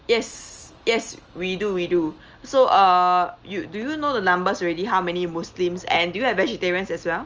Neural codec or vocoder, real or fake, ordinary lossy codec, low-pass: none; real; Opus, 24 kbps; 7.2 kHz